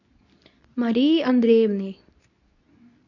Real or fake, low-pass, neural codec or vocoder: fake; 7.2 kHz; codec, 24 kHz, 0.9 kbps, WavTokenizer, medium speech release version 2